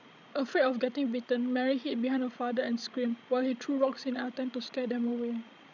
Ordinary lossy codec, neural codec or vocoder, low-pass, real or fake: none; codec, 16 kHz, 16 kbps, FreqCodec, larger model; 7.2 kHz; fake